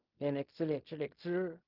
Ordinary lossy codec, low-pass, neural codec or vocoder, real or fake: Opus, 32 kbps; 5.4 kHz; codec, 16 kHz in and 24 kHz out, 0.4 kbps, LongCat-Audio-Codec, fine tuned four codebook decoder; fake